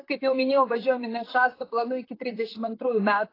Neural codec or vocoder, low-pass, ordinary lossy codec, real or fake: vocoder, 44.1 kHz, 128 mel bands, Pupu-Vocoder; 5.4 kHz; AAC, 24 kbps; fake